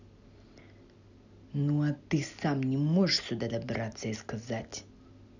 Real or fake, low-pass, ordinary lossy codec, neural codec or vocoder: real; 7.2 kHz; none; none